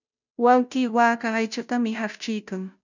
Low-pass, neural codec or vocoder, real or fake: 7.2 kHz; codec, 16 kHz, 0.5 kbps, FunCodec, trained on Chinese and English, 25 frames a second; fake